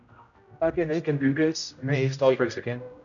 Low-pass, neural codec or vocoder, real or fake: 7.2 kHz; codec, 16 kHz, 0.5 kbps, X-Codec, HuBERT features, trained on general audio; fake